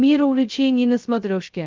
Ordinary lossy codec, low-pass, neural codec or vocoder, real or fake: Opus, 24 kbps; 7.2 kHz; codec, 16 kHz, 0.2 kbps, FocalCodec; fake